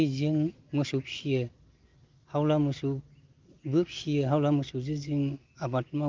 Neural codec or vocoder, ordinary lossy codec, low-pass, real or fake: none; Opus, 32 kbps; 7.2 kHz; real